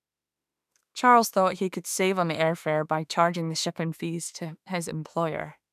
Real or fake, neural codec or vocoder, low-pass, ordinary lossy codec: fake; autoencoder, 48 kHz, 32 numbers a frame, DAC-VAE, trained on Japanese speech; 14.4 kHz; none